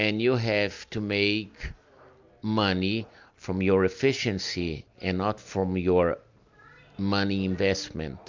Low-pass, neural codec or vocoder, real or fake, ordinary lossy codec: 7.2 kHz; none; real; MP3, 64 kbps